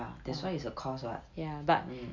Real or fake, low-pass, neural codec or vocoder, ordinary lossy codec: real; 7.2 kHz; none; none